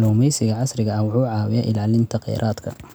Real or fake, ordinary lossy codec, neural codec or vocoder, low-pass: real; none; none; none